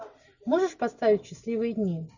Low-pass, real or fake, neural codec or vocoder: 7.2 kHz; real; none